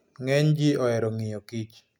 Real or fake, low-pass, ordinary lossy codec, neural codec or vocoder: real; 19.8 kHz; none; none